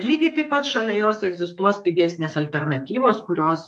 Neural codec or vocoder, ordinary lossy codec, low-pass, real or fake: codec, 32 kHz, 1.9 kbps, SNAC; MP3, 64 kbps; 10.8 kHz; fake